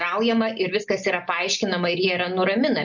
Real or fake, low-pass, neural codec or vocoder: real; 7.2 kHz; none